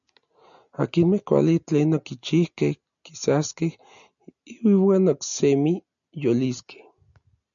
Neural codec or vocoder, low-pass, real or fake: none; 7.2 kHz; real